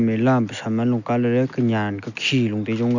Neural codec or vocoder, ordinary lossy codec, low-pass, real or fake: none; none; 7.2 kHz; real